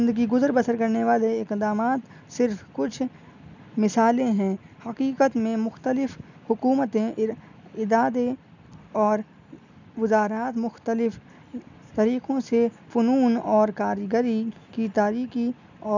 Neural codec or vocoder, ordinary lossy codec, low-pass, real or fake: none; none; 7.2 kHz; real